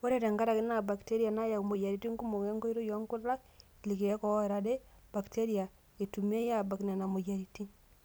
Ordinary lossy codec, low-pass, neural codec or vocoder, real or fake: none; none; none; real